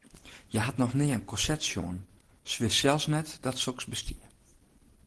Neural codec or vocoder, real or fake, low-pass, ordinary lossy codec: none; real; 10.8 kHz; Opus, 16 kbps